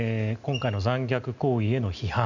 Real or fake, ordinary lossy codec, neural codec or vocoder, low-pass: real; none; none; 7.2 kHz